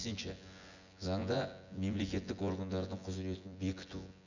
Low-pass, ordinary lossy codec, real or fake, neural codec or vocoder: 7.2 kHz; none; fake; vocoder, 24 kHz, 100 mel bands, Vocos